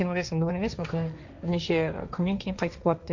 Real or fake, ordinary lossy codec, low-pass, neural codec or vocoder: fake; none; 7.2 kHz; codec, 16 kHz, 1.1 kbps, Voila-Tokenizer